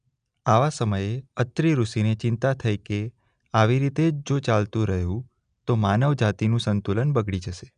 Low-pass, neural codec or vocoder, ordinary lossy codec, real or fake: 9.9 kHz; none; none; real